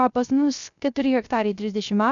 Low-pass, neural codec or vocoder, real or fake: 7.2 kHz; codec, 16 kHz, 0.3 kbps, FocalCodec; fake